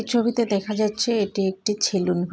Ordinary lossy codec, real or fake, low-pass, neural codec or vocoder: none; real; none; none